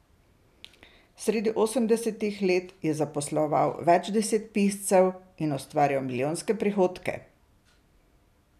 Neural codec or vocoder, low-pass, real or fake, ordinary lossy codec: none; 14.4 kHz; real; none